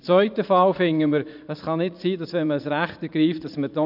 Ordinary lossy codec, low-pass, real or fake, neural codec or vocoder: none; 5.4 kHz; fake; vocoder, 44.1 kHz, 80 mel bands, Vocos